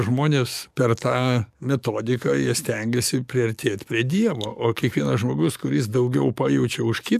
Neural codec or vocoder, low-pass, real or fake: codec, 44.1 kHz, 7.8 kbps, DAC; 14.4 kHz; fake